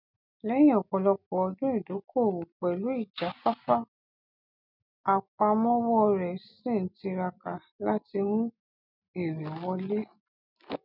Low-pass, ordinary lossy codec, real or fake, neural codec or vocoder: 5.4 kHz; none; real; none